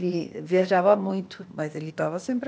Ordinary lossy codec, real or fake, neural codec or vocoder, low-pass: none; fake; codec, 16 kHz, 0.8 kbps, ZipCodec; none